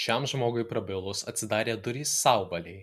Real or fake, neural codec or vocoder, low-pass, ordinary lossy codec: real; none; 14.4 kHz; MP3, 96 kbps